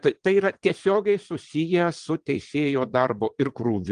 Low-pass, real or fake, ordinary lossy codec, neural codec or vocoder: 9.9 kHz; fake; Opus, 24 kbps; vocoder, 22.05 kHz, 80 mel bands, WaveNeXt